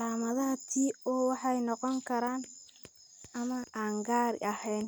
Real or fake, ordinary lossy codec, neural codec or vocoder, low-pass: real; none; none; none